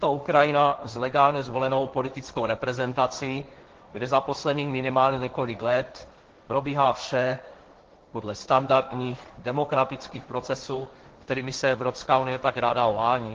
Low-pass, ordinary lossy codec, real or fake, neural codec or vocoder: 7.2 kHz; Opus, 16 kbps; fake; codec, 16 kHz, 1.1 kbps, Voila-Tokenizer